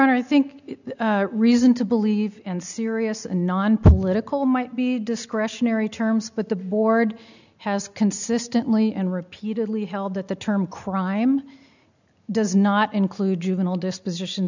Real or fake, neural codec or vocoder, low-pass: real; none; 7.2 kHz